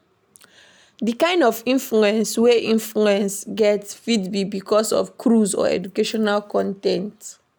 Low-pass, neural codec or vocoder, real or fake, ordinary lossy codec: none; none; real; none